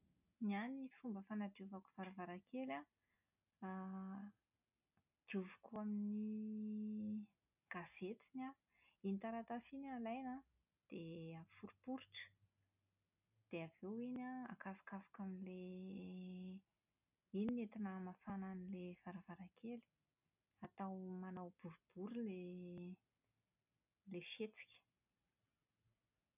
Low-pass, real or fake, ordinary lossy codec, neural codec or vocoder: 3.6 kHz; real; none; none